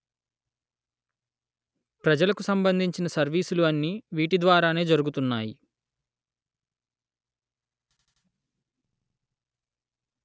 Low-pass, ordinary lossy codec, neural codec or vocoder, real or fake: none; none; none; real